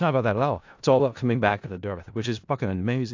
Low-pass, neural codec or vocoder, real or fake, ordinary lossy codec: 7.2 kHz; codec, 16 kHz in and 24 kHz out, 0.4 kbps, LongCat-Audio-Codec, four codebook decoder; fake; AAC, 48 kbps